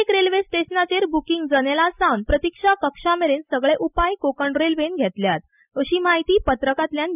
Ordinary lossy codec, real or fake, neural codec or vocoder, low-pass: none; real; none; 3.6 kHz